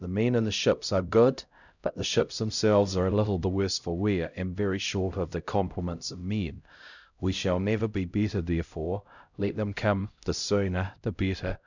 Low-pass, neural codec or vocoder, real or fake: 7.2 kHz; codec, 16 kHz, 0.5 kbps, X-Codec, HuBERT features, trained on LibriSpeech; fake